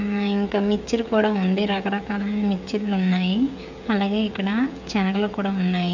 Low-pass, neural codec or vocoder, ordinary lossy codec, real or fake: 7.2 kHz; codec, 16 kHz, 16 kbps, FreqCodec, smaller model; none; fake